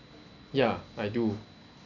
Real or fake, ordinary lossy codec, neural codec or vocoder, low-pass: real; none; none; 7.2 kHz